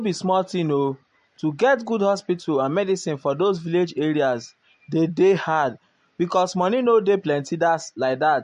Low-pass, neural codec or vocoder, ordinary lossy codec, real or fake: 14.4 kHz; none; MP3, 48 kbps; real